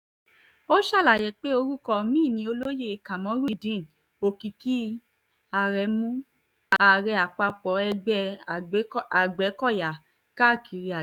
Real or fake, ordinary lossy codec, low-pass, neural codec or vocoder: fake; none; 19.8 kHz; codec, 44.1 kHz, 7.8 kbps, DAC